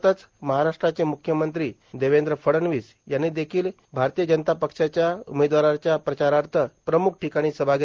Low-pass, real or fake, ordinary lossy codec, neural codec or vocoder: 7.2 kHz; real; Opus, 16 kbps; none